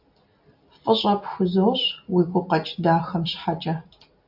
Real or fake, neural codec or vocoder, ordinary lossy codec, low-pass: real; none; AAC, 48 kbps; 5.4 kHz